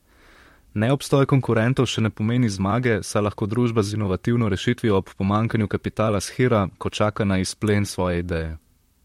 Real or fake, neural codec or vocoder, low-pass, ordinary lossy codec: fake; vocoder, 44.1 kHz, 128 mel bands, Pupu-Vocoder; 19.8 kHz; MP3, 64 kbps